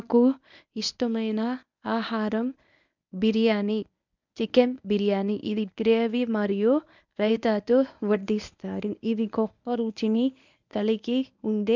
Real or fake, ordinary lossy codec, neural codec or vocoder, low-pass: fake; none; codec, 24 kHz, 0.9 kbps, WavTokenizer, medium speech release version 1; 7.2 kHz